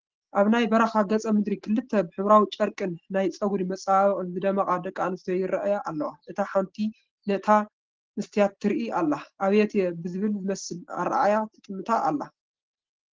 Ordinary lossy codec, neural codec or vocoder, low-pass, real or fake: Opus, 16 kbps; none; 7.2 kHz; real